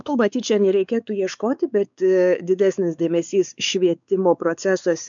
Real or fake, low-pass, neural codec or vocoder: fake; 7.2 kHz; codec, 16 kHz, 4 kbps, X-Codec, WavLM features, trained on Multilingual LibriSpeech